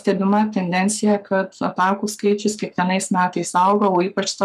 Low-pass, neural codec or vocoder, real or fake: 14.4 kHz; codec, 44.1 kHz, 7.8 kbps, Pupu-Codec; fake